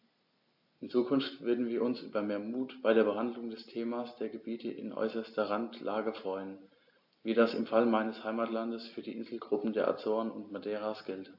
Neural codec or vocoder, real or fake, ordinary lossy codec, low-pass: none; real; AAC, 48 kbps; 5.4 kHz